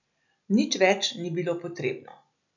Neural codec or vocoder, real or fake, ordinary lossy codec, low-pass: none; real; none; 7.2 kHz